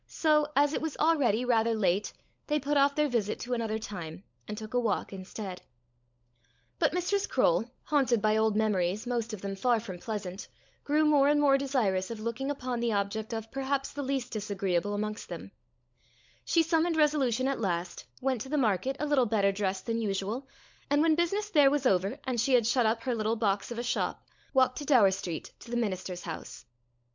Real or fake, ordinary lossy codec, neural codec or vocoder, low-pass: fake; MP3, 64 kbps; codec, 16 kHz, 16 kbps, FunCodec, trained on LibriTTS, 50 frames a second; 7.2 kHz